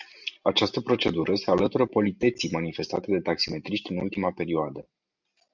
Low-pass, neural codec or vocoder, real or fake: 7.2 kHz; none; real